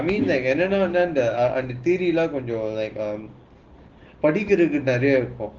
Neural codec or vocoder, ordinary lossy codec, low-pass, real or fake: none; Opus, 16 kbps; 7.2 kHz; real